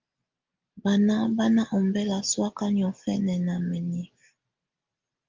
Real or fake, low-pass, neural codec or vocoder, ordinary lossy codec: real; 7.2 kHz; none; Opus, 32 kbps